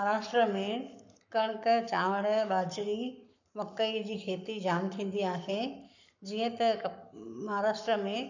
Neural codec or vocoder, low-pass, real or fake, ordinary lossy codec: codec, 44.1 kHz, 7.8 kbps, Pupu-Codec; 7.2 kHz; fake; none